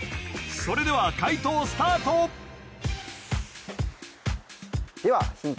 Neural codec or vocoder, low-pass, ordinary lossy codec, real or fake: none; none; none; real